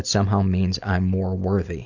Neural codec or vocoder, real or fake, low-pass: none; real; 7.2 kHz